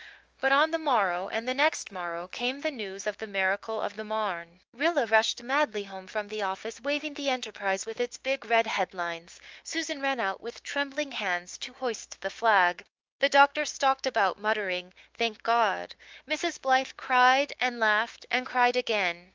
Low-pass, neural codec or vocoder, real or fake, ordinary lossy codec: 7.2 kHz; codec, 16 kHz, 6 kbps, DAC; fake; Opus, 24 kbps